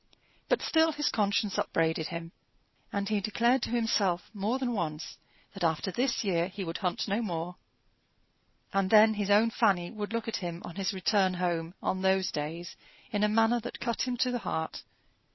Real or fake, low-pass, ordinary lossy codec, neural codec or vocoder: real; 7.2 kHz; MP3, 24 kbps; none